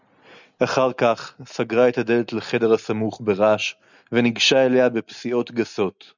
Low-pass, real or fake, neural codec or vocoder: 7.2 kHz; real; none